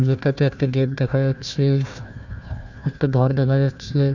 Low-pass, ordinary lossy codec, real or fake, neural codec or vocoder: 7.2 kHz; none; fake; codec, 16 kHz, 1 kbps, FunCodec, trained on Chinese and English, 50 frames a second